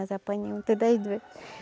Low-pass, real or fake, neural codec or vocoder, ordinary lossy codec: none; real; none; none